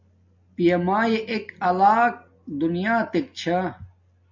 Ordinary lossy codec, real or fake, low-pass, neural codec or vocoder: MP3, 48 kbps; real; 7.2 kHz; none